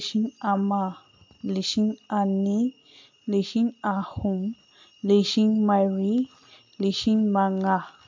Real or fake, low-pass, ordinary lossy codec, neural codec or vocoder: real; 7.2 kHz; MP3, 48 kbps; none